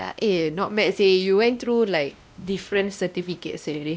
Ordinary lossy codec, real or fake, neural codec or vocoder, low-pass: none; fake; codec, 16 kHz, 1 kbps, X-Codec, WavLM features, trained on Multilingual LibriSpeech; none